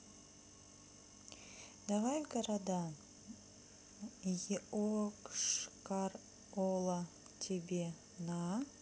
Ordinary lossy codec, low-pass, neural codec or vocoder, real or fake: none; none; none; real